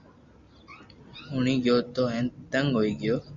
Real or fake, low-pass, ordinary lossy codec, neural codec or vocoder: real; 7.2 kHz; Opus, 64 kbps; none